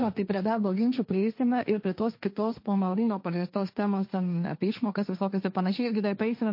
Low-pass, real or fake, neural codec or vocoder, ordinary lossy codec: 5.4 kHz; fake; codec, 16 kHz, 1.1 kbps, Voila-Tokenizer; MP3, 32 kbps